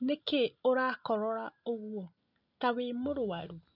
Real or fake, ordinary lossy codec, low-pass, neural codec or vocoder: real; none; 5.4 kHz; none